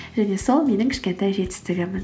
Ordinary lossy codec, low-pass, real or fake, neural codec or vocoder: none; none; real; none